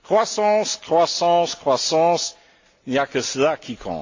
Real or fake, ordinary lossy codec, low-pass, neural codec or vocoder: real; AAC, 48 kbps; 7.2 kHz; none